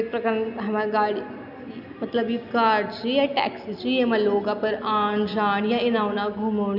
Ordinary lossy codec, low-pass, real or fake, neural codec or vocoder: none; 5.4 kHz; real; none